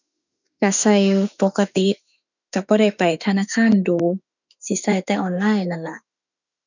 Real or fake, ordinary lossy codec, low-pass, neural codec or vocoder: fake; none; 7.2 kHz; autoencoder, 48 kHz, 32 numbers a frame, DAC-VAE, trained on Japanese speech